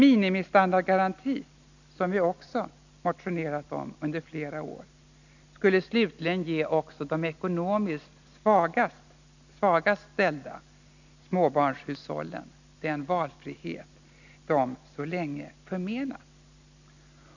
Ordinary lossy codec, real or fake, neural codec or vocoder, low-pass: none; real; none; 7.2 kHz